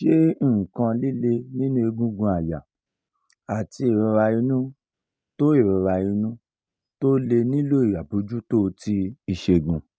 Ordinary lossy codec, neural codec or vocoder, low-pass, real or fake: none; none; none; real